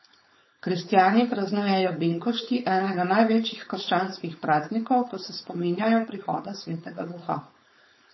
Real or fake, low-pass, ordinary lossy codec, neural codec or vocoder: fake; 7.2 kHz; MP3, 24 kbps; codec, 16 kHz, 4.8 kbps, FACodec